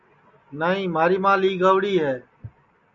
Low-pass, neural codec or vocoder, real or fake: 7.2 kHz; none; real